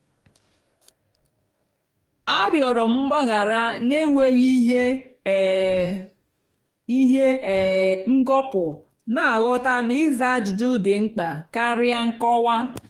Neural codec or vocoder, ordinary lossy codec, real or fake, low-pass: codec, 44.1 kHz, 2.6 kbps, DAC; Opus, 32 kbps; fake; 19.8 kHz